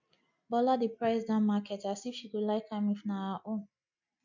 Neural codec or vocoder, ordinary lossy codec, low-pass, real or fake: none; none; 7.2 kHz; real